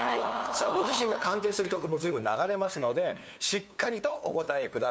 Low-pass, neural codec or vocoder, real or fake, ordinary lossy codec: none; codec, 16 kHz, 2 kbps, FunCodec, trained on LibriTTS, 25 frames a second; fake; none